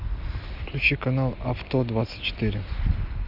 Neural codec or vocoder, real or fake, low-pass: none; real; 5.4 kHz